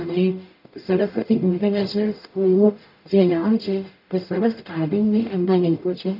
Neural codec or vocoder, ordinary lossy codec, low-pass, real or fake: codec, 44.1 kHz, 0.9 kbps, DAC; none; 5.4 kHz; fake